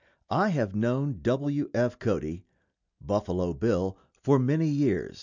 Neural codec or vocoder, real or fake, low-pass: none; real; 7.2 kHz